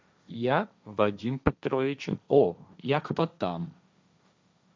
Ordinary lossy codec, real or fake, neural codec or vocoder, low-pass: none; fake; codec, 16 kHz, 1.1 kbps, Voila-Tokenizer; none